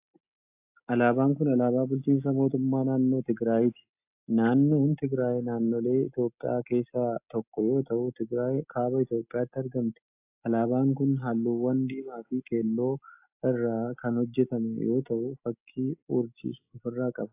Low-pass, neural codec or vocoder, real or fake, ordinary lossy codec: 3.6 kHz; none; real; AAC, 32 kbps